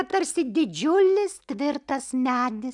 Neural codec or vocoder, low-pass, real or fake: none; 10.8 kHz; real